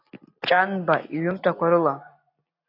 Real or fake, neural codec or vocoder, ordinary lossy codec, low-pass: real; none; AAC, 32 kbps; 5.4 kHz